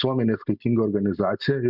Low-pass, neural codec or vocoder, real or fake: 5.4 kHz; none; real